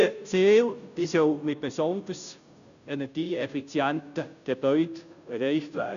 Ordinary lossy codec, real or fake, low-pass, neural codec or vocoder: none; fake; 7.2 kHz; codec, 16 kHz, 0.5 kbps, FunCodec, trained on Chinese and English, 25 frames a second